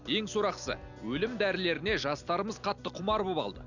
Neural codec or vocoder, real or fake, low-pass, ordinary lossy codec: none; real; 7.2 kHz; none